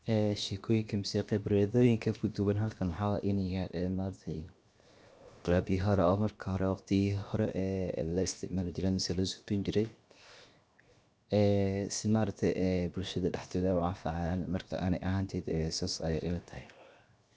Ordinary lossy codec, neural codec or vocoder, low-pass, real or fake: none; codec, 16 kHz, 0.7 kbps, FocalCodec; none; fake